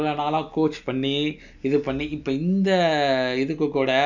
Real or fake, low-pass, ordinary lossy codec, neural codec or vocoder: real; 7.2 kHz; none; none